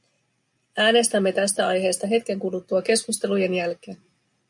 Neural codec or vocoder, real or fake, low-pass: none; real; 10.8 kHz